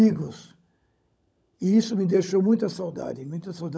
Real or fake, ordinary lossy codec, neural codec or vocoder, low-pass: fake; none; codec, 16 kHz, 16 kbps, FunCodec, trained on LibriTTS, 50 frames a second; none